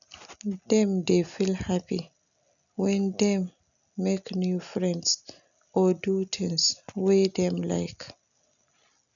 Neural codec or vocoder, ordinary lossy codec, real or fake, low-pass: none; none; real; 7.2 kHz